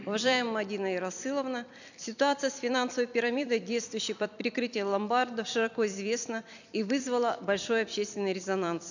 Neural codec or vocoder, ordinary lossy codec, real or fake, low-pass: vocoder, 44.1 kHz, 128 mel bands every 256 samples, BigVGAN v2; none; fake; 7.2 kHz